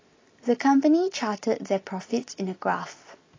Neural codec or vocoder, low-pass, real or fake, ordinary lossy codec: none; 7.2 kHz; real; AAC, 32 kbps